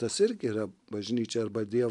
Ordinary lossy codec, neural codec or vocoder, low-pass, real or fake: MP3, 96 kbps; none; 10.8 kHz; real